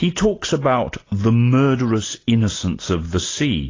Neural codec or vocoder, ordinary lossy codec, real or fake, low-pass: none; AAC, 32 kbps; real; 7.2 kHz